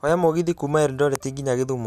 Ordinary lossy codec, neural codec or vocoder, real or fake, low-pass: none; none; real; 14.4 kHz